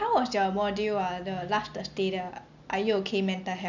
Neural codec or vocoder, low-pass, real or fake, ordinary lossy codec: none; 7.2 kHz; real; none